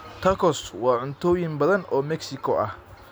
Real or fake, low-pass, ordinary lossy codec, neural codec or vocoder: real; none; none; none